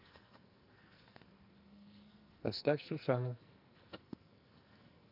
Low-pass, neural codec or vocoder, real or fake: 5.4 kHz; codec, 44.1 kHz, 2.6 kbps, SNAC; fake